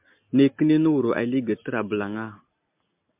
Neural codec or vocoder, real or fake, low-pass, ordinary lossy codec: none; real; 3.6 kHz; MP3, 32 kbps